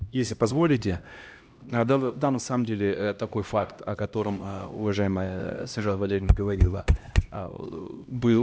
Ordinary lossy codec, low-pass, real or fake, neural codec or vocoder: none; none; fake; codec, 16 kHz, 1 kbps, X-Codec, HuBERT features, trained on LibriSpeech